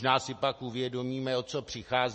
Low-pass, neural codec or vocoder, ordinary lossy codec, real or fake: 10.8 kHz; none; MP3, 32 kbps; real